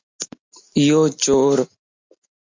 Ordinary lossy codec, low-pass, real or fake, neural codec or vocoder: MP3, 48 kbps; 7.2 kHz; real; none